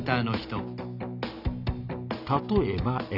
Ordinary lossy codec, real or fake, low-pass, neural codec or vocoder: none; real; 5.4 kHz; none